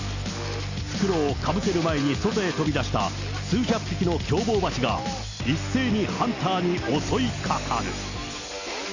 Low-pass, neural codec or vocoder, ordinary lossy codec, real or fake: 7.2 kHz; none; Opus, 64 kbps; real